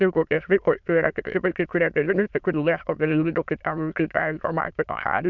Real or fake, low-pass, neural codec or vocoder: fake; 7.2 kHz; autoencoder, 22.05 kHz, a latent of 192 numbers a frame, VITS, trained on many speakers